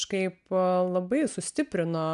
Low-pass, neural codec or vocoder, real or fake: 10.8 kHz; none; real